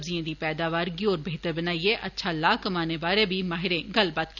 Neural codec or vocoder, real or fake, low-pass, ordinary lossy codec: none; real; 7.2 kHz; none